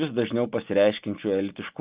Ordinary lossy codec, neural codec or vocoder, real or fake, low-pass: Opus, 32 kbps; none; real; 3.6 kHz